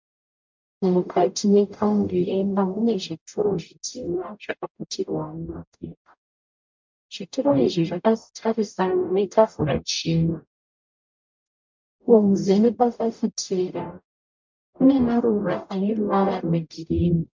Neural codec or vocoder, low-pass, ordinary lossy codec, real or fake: codec, 44.1 kHz, 0.9 kbps, DAC; 7.2 kHz; MP3, 64 kbps; fake